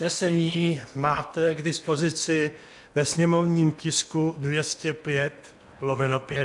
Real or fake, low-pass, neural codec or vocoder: fake; 10.8 kHz; codec, 16 kHz in and 24 kHz out, 0.8 kbps, FocalCodec, streaming, 65536 codes